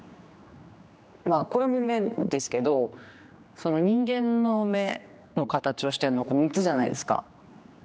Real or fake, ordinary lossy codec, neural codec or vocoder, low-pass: fake; none; codec, 16 kHz, 2 kbps, X-Codec, HuBERT features, trained on general audio; none